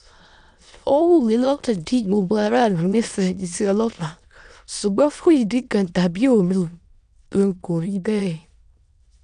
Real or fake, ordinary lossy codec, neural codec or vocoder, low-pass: fake; MP3, 96 kbps; autoencoder, 22.05 kHz, a latent of 192 numbers a frame, VITS, trained on many speakers; 9.9 kHz